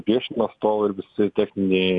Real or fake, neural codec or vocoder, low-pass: real; none; 10.8 kHz